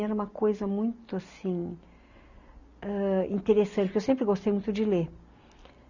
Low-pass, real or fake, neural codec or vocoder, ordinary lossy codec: 7.2 kHz; real; none; none